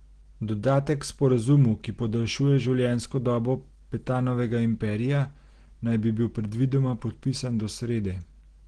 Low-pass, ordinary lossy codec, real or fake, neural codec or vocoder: 9.9 kHz; Opus, 16 kbps; real; none